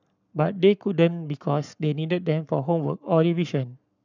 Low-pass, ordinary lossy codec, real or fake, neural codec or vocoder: 7.2 kHz; none; fake; codec, 44.1 kHz, 7.8 kbps, Pupu-Codec